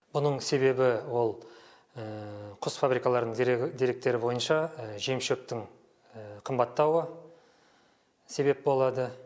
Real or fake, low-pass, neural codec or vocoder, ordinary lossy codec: real; none; none; none